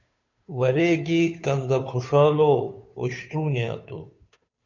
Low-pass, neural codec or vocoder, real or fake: 7.2 kHz; codec, 16 kHz, 2 kbps, FunCodec, trained on Chinese and English, 25 frames a second; fake